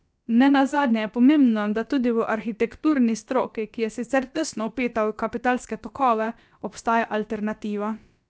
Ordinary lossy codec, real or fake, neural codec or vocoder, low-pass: none; fake; codec, 16 kHz, about 1 kbps, DyCAST, with the encoder's durations; none